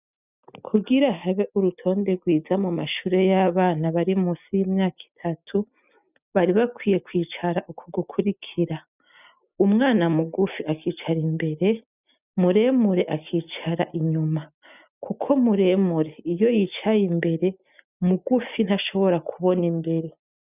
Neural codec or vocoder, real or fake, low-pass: none; real; 3.6 kHz